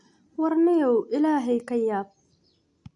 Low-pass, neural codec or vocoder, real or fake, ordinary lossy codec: 10.8 kHz; none; real; AAC, 64 kbps